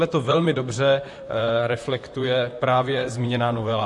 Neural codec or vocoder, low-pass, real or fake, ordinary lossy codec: vocoder, 44.1 kHz, 128 mel bands, Pupu-Vocoder; 10.8 kHz; fake; MP3, 48 kbps